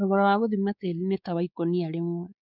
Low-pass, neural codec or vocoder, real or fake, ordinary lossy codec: 5.4 kHz; codec, 16 kHz, 4 kbps, X-Codec, WavLM features, trained on Multilingual LibriSpeech; fake; none